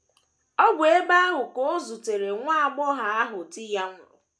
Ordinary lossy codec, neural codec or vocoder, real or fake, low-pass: none; none; real; none